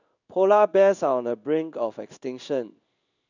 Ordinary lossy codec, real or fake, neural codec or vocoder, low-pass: none; fake; codec, 16 kHz in and 24 kHz out, 1 kbps, XY-Tokenizer; 7.2 kHz